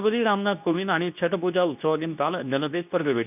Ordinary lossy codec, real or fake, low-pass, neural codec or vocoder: none; fake; 3.6 kHz; codec, 24 kHz, 0.9 kbps, WavTokenizer, medium speech release version 2